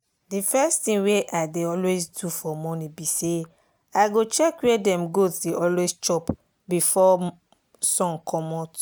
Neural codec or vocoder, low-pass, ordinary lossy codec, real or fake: none; none; none; real